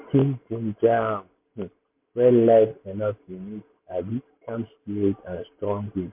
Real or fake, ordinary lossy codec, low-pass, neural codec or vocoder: real; MP3, 32 kbps; 3.6 kHz; none